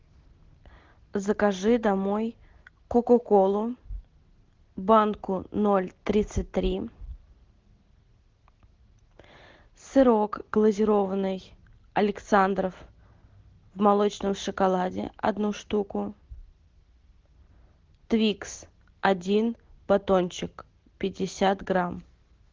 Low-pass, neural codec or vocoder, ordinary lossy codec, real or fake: 7.2 kHz; none; Opus, 16 kbps; real